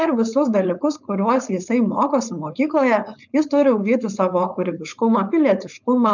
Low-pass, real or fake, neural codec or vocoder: 7.2 kHz; fake; codec, 16 kHz, 4.8 kbps, FACodec